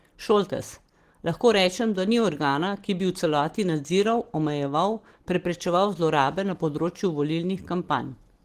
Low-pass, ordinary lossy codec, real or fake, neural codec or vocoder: 14.4 kHz; Opus, 16 kbps; real; none